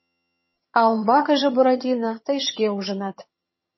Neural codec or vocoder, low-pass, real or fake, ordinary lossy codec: vocoder, 22.05 kHz, 80 mel bands, HiFi-GAN; 7.2 kHz; fake; MP3, 24 kbps